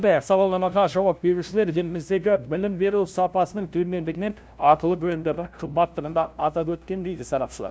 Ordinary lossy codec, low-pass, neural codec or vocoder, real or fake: none; none; codec, 16 kHz, 0.5 kbps, FunCodec, trained on LibriTTS, 25 frames a second; fake